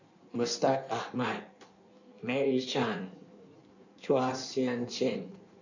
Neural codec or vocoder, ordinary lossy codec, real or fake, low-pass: codec, 16 kHz in and 24 kHz out, 1.1 kbps, FireRedTTS-2 codec; none; fake; 7.2 kHz